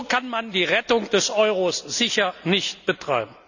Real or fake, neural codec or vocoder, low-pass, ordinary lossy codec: real; none; 7.2 kHz; none